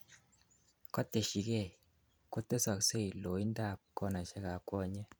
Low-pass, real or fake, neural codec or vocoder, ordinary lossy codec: none; fake; vocoder, 44.1 kHz, 128 mel bands every 512 samples, BigVGAN v2; none